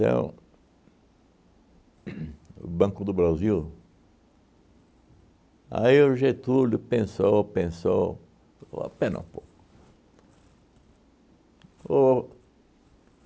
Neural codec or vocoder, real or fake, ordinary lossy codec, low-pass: none; real; none; none